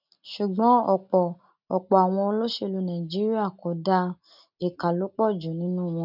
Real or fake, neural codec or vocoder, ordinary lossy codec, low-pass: real; none; none; 5.4 kHz